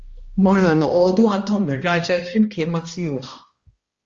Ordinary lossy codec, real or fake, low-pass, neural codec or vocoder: Opus, 32 kbps; fake; 7.2 kHz; codec, 16 kHz, 1 kbps, X-Codec, HuBERT features, trained on balanced general audio